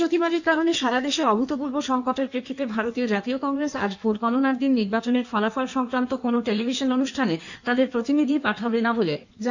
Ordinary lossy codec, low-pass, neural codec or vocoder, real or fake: none; 7.2 kHz; codec, 16 kHz in and 24 kHz out, 1.1 kbps, FireRedTTS-2 codec; fake